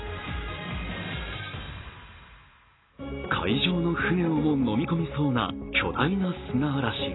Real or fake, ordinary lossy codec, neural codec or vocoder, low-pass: real; AAC, 16 kbps; none; 7.2 kHz